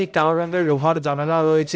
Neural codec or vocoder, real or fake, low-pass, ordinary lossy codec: codec, 16 kHz, 0.5 kbps, X-Codec, HuBERT features, trained on balanced general audio; fake; none; none